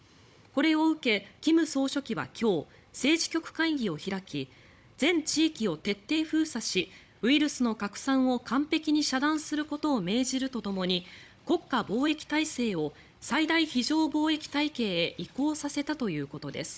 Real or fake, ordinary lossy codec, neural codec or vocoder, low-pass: fake; none; codec, 16 kHz, 16 kbps, FunCodec, trained on Chinese and English, 50 frames a second; none